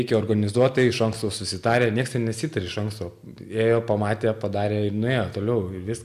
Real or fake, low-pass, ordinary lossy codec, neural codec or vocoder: real; 14.4 kHz; AAC, 96 kbps; none